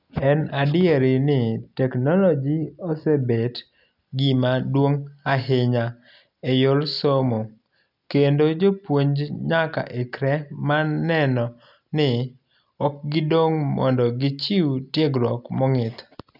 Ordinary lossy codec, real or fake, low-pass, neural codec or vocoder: none; real; 5.4 kHz; none